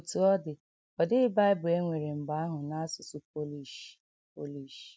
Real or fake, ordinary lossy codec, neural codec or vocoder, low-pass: real; none; none; none